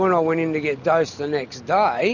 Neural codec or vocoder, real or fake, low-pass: none; real; 7.2 kHz